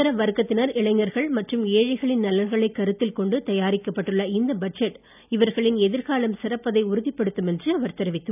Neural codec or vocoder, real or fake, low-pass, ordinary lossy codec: none; real; 3.6 kHz; none